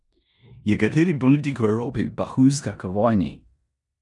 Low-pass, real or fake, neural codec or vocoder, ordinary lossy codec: 10.8 kHz; fake; codec, 16 kHz in and 24 kHz out, 0.9 kbps, LongCat-Audio-Codec, four codebook decoder; none